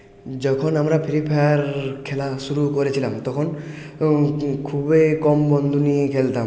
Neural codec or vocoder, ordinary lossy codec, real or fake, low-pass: none; none; real; none